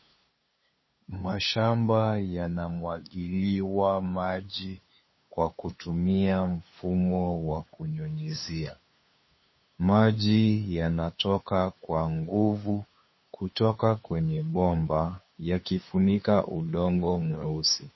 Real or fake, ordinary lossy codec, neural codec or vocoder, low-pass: fake; MP3, 24 kbps; codec, 16 kHz, 2 kbps, FunCodec, trained on LibriTTS, 25 frames a second; 7.2 kHz